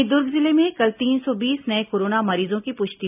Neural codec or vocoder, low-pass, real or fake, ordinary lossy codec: none; 3.6 kHz; real; none